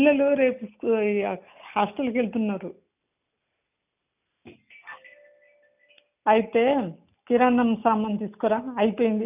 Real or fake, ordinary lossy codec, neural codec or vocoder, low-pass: real; none; none; 3.6 kHz